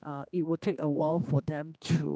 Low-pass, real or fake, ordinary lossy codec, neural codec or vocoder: none; fake; none; codec, 16 kHz, 2 kbps, X-Codec, HuBERT features, trained on general audio